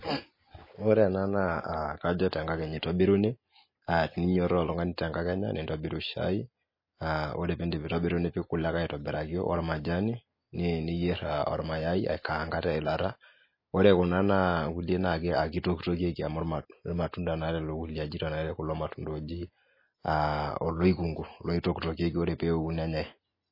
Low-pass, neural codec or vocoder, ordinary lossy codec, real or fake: 5.4 kHz; none; MP3, 24 kbps; real